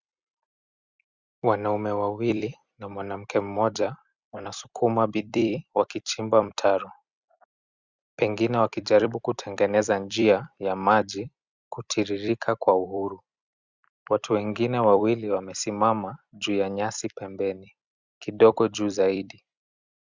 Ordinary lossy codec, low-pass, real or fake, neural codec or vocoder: Opus, 64 kbps; 7.2 kHz; fake; vocoder, 44.1 kHz, 128 mel bands every 256 samples, BigVGAN v2